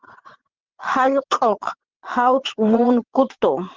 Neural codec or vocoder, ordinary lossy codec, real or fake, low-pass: vocoder, 22.05 kHz, 80 mel bands, WaveNeXt; Opus, 16 kbps; fake; 7.2 kHz